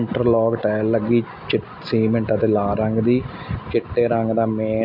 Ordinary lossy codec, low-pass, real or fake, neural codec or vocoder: MP3, 48 kbps; 5.4 kHz; real; none